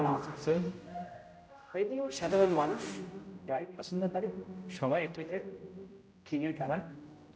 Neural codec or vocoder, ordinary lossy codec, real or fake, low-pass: codec, 16 kHz, 0.5 kbps, X-Codec, HuBERT features, trained on general audio; none; fake; none